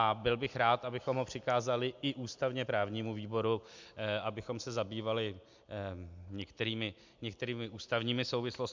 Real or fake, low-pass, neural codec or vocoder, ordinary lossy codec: real; 7.2 kHz; none; MP3, 64 kbps